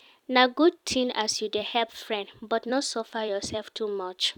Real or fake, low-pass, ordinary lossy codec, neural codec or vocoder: fake; 19.8 kHz; none; vocoder, 44.1 kHz, 128 mel bands every 256 samples, BigVGAN v2